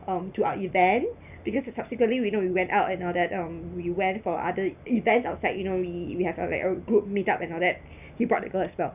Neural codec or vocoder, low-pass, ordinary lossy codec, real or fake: none; 3.6 kHz; none; real